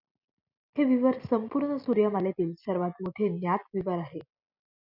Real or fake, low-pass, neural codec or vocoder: real; 5.4 kHz; none